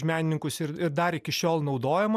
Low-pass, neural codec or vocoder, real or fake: 14.4 kHz; none; real